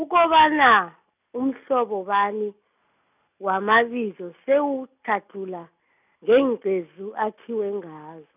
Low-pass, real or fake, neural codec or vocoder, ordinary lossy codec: 3.6 kHz; real; none; none